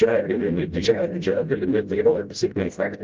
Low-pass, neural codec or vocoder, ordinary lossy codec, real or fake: 7.2 kHz; codec, 16 kHz, 0.5 kbps, FreqCodec, smaller model; Opus, 24 kbps; fake